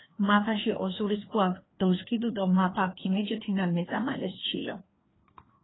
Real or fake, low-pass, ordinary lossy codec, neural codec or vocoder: fake; 7.2 kHz; AAC, 16 kbps; codec, 16 kHz, 2 kbps, FreqCodec, larger model